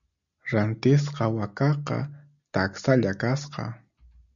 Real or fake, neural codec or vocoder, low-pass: real; none; 7.2 kHz